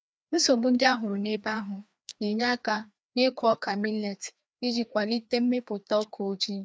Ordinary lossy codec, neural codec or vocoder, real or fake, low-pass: none; codec, 16 kHz, 2 kbps, FreqCodec, larger model; fake; none